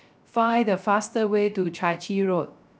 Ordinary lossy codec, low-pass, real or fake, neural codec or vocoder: none; none; fake; codec, 16 kHz, 0.3 kbps, FocalCodec